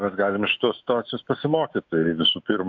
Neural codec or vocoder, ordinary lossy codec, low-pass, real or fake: autoencoder, 48 kHz, 128 numbers a frame, DAC-VAE, trained on Japanese speech; AAC, 48 kbps; 7.2 kHz; fake